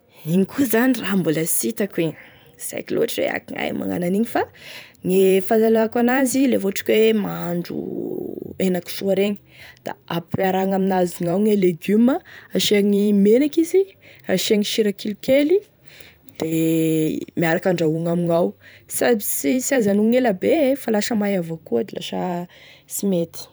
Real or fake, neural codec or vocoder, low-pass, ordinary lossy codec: fake; vocoder, 44.1 kHz, 128 mel bands every 512 samples, BigVGAN v2; none; none